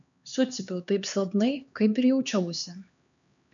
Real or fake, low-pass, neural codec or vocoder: fake; 7.2 kHz; codec, 16 kHz, 2 kbps, X-Codec, HuBERT features, trained on LibriSpeech